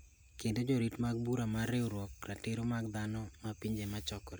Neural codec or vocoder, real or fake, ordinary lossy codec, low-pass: none; real; none; none